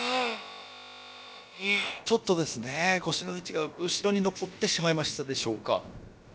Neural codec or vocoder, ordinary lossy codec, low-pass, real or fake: codec, 16 kHz, about 1 kbps, DyCAST, with the encoder's durations; none; none; fake